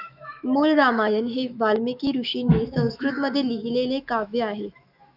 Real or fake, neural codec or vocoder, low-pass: fake; autoencoder, 48 kHz, 128 numbers a frame, DAC-VAE, trained on Japanese speech; 5.4 kHz